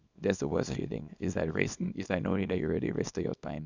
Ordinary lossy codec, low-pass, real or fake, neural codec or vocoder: none; 7.2 kHz; fake; codec, 24 kHz, 0.9 kbps, WavTokenizer, small release